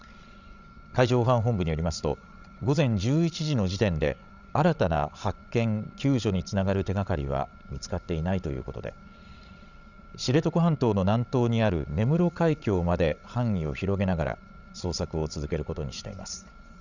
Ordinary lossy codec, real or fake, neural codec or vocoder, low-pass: none; fake; codec, 16 kHz, 16 kbps, FreqCodec, larger model; 7.2 kHz